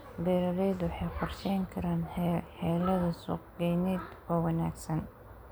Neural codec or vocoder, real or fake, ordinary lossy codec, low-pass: none; real; none; none